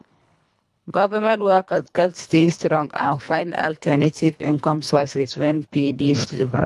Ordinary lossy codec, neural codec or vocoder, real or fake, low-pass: none; codec, 24 kHz, 1.5 kbps, HILCodec; fake; none